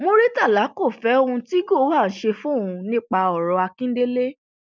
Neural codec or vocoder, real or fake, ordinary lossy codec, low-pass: none; real; none; none